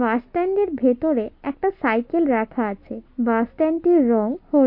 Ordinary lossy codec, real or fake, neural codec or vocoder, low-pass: MP3, 32 kbps; real; none; 5.4 kHz